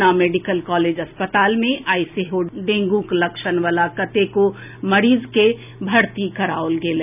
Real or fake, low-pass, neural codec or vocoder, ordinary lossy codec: real; 3.6 kHz; none; none